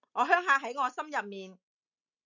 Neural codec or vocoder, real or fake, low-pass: none; real; 7.2 kHz